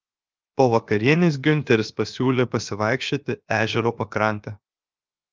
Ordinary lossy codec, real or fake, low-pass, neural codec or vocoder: Opus, 24 kbps; fake; 7.2 kHz; codec, 16 kHz, 0.7 kbps, FocalCodec